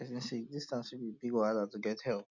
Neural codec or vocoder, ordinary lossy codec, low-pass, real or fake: none; none; 7.2 kHz; real